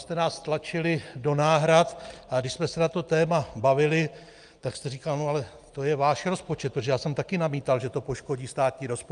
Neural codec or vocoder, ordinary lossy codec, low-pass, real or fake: none; Opus, 32 kbps; 9.9 kHz; real